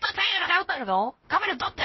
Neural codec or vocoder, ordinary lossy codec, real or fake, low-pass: codec, 16 kHz, 0.7 kbps, FocalCodec; MP3, 24 kbps; fake; 7.2 kHz